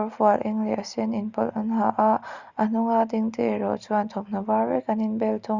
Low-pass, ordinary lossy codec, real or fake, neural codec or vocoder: 7.2 kHz; Opus, 64 kbps; real; none